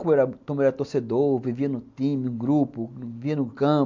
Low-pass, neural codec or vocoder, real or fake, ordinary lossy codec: 7.2 kHz; none; real; MP3, 64 kbps